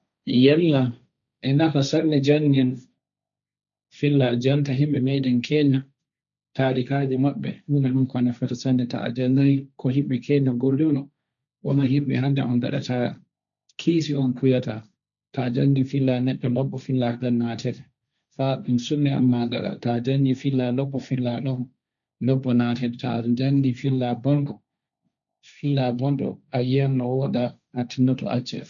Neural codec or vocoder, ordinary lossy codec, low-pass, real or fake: codec, 16 kHz, 1.1 kbps, Voila-Tokenizer; none; 7.2 kHz; fake